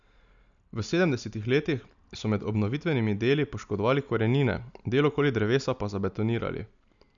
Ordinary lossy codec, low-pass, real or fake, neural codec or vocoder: none; 7.2 kHz; real; none